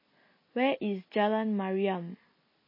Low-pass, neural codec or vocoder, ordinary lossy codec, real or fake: 5.4 kHz; none; MP3, 24 kbps; real